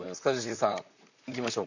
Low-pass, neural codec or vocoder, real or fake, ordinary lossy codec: 7.2 kHz; vocoder, 44.1 kHz, 128 mel bands, Pupu-Vocoder; fake; none